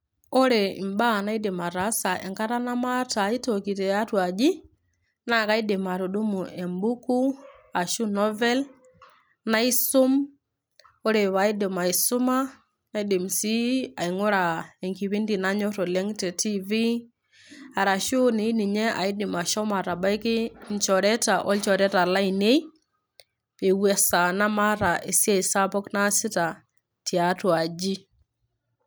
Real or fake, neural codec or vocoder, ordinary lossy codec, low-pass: real; none; none; none